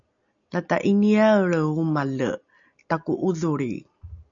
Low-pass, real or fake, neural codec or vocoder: 7.2 kHz; real; none